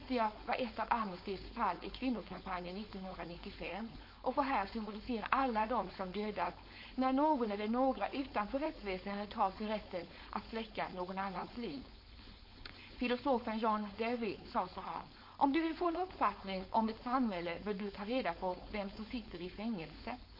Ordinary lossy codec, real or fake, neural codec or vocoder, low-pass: MP3, 32 kbps; fake; codec, 16 kHz, 4.8 kbps, FACodec; 5.4 kHz